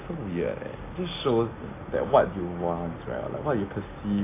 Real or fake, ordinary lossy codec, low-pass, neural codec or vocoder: real; AAC, 24 kbps; 3.6 kHz; none